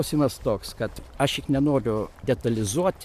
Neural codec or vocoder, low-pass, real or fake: vocoder, 44.1 kHz, 128 mel bands every 512 samples, BigVGAN v2; 14.4 kHz; fake